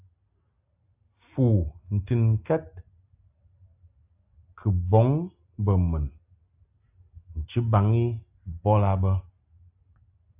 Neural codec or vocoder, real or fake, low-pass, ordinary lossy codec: none; real; 3.6 kHz; AAC, 32 kbps